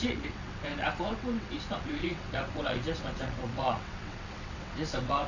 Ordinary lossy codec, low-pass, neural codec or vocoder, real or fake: none; 7.2 kHz; vocoder, 22.05 kHz, 80 mel bands, WaveNeXt; fake